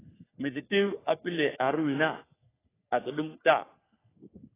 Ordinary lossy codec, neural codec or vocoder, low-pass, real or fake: AAC, 16 kbps; codec, 44.1 kHz, 3.4 kbps, Pupu-Codec; 3.6 kHz; fake